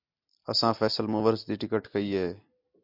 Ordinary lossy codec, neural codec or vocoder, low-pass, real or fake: MP3, 48 kbps; none; 5.4 kHz; real